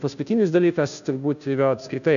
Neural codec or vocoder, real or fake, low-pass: codec, 16 kHz, 0.5 kbps, FunCodec, trained on Chinese and English, 25 frames a second; fake; 7.2 kHz